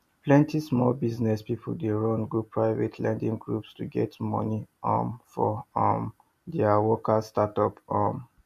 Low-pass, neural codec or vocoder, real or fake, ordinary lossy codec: 14.4 kHz; none; real; MP3, 64 kbps